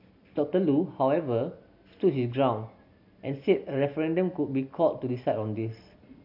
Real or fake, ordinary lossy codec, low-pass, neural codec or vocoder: real; MP3, 48 kbps; 5.4 kHz; none